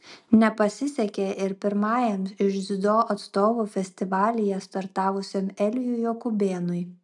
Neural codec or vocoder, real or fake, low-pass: none; real; 10.8 kHz